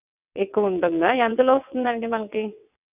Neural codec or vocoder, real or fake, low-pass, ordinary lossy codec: vocoder, 22.05 kHz, 80 mel bands, WaveNeXt; fake; 3.6 kHz; none